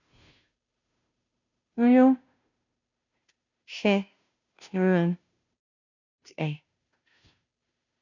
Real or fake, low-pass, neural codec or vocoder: fake; 7.2 kHz; codec, 16 kHz, 0.5 kbps, FunCodec, trained on Chinese and English, 25 frames a second